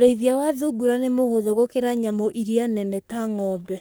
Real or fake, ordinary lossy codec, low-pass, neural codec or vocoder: fake; none; none; codec, 44.1 kHz, 3.4 kbps, Pupu-Codec